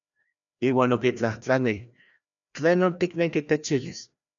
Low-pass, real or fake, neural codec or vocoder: 7.2 kHz; fake; codec, 16 kHz, 1 kbps, FreqCodec, larger model